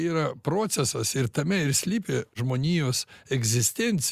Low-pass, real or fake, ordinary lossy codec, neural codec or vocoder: 14.4 kHz; real; Opus, 64 kbps; none